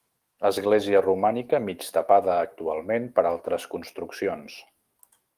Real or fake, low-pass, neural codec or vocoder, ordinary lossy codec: fake; 14.4 kHz; autoencoder, 48 kHz, 128 numbers a frame, DAC-VAE, trained on Japanese speech; Opus, 32 kbps